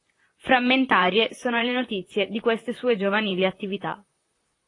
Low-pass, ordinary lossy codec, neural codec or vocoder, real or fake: 10.8 kHz; AAC, 32 kbps; vocoder, 44.1 kHz, 128 mel bands, Pupu-Vocoder; fake